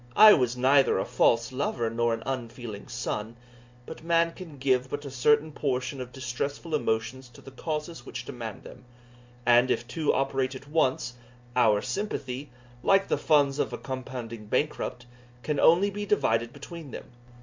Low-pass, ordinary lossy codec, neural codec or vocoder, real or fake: 7.2 kHz; MP3, 64 kbps; none; real